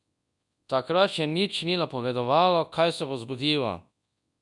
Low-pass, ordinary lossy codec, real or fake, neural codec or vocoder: 10.8 kHz; none; fake; codec, 24 kHz, 0.9 kbps, WavTokenizer, large speech release